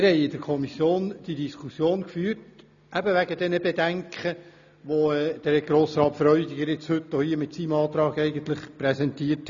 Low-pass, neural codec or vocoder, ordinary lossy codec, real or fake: 7.2 kHz; none; none; real